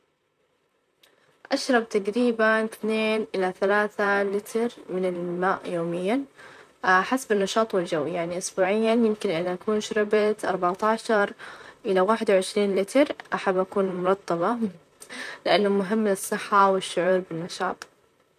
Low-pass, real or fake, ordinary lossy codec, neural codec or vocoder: 14.4 kHz; fake; none; vocoder, 44.1 kHz, 128 mel bands, Pupu-Vocoder